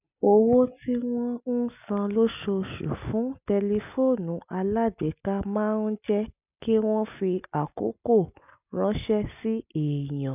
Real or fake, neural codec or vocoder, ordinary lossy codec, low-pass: real; none; none; 3.6 kHz